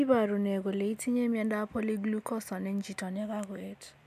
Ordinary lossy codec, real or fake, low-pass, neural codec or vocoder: MP3, 96 kbps; real; 14.4 kHz; none